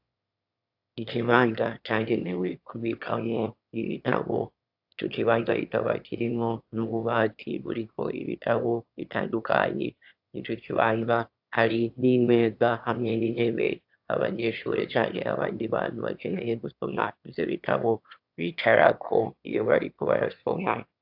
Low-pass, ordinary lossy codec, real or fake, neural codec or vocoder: 5.4 kHz; AAC, 48 kbps; fake; autoencoder, 22.05 kHz, a latent of 192 numbers a frame, VITS, trained on one speaker